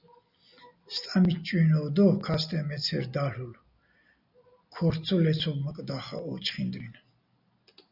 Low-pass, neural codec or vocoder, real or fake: 5.4 kHz; none; real